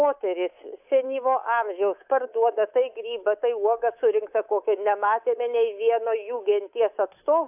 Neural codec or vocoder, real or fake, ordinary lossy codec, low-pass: codec, 24 kHz, 3.1 kbps, DualCodec; fake; AAC, 32 kbps; 3.6 kHz